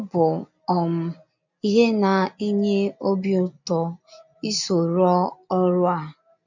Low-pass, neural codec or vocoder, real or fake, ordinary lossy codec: 7.2 kHz; none; real; none